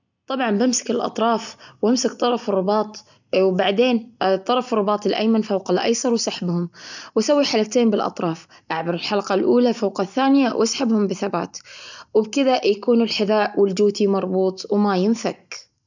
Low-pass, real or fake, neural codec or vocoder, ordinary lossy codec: 7.2 kHz; real; none; none